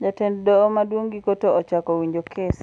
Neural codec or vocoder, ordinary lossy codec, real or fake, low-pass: none; none; real; 9.9 kHz